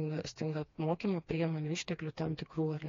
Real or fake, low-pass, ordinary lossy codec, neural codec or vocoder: fake; 7.2 kHz; AAC, 32 kbps; codec, 16 kHz, 2 kbps, FreqCodec, smaller model